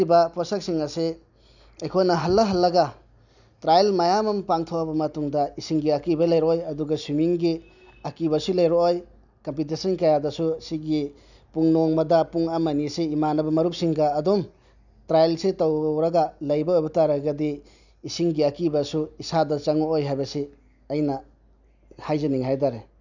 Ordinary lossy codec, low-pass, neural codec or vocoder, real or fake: none; 7.2 kHz; none; real